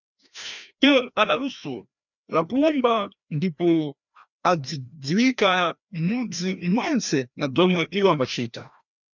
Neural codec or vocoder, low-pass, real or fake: codec, 16 kHz, 1 kbps, FreqCodec, larger model; 7.2 kHz; fake